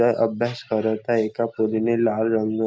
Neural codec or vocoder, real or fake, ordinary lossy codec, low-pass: none; real; none; none